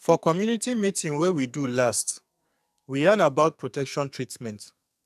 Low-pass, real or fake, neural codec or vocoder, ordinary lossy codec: 14.4 kHz; fake; codec, 44.1 kHz, 2.6 kbps, SNAC; none